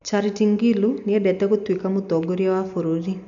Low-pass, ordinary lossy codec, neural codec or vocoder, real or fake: 7.2 kHz; none; none; real